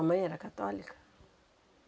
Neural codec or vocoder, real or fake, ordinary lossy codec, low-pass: none; real; none; none